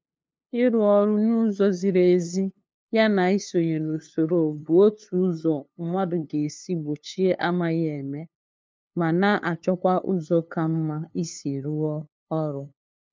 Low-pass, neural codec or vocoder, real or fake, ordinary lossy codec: none; codec, 16 kHz, 2 kbps, FunCodec, trained on LibriTTS, 25 frames a second; fake; none